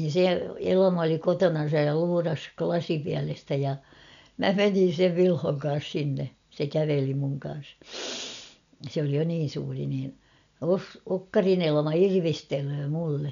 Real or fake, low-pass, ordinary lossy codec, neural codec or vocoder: real; 7.2 kHz; none; none